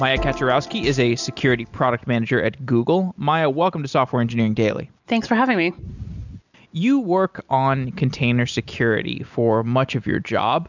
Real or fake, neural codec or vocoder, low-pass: real; none; 7.2 kHz